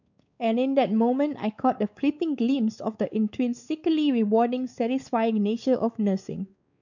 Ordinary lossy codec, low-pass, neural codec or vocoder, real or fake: none; 7.2 kHz; codec, 16 kHz, 4 kbps, X-Codec, WavLM features, trained on Multilingual LibriSpeech; fake